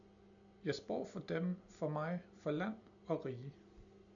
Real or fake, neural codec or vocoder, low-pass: real; none; 7.2 kHz